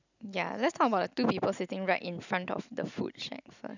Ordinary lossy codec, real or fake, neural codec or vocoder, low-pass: none; real; none; 7.2 kHz